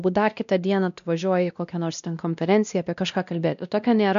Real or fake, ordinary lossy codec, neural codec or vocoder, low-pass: fake; MP3, 96 kbps; codec, 16 kHz, 1 kbps, X-Codec, WavLM features, trained on Multilingual LibriSpeech; 7.2 kHz